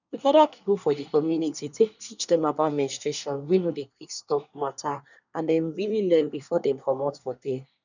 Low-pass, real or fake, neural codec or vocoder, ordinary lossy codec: 7.2 kHz; fake; codec, 24 kHz, 1 kbps, SNAC; none